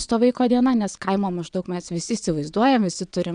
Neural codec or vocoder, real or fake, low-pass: vocoder, 22.05 kHz, 80 mel bands, WaveNeXt; fake; 9.9 kHz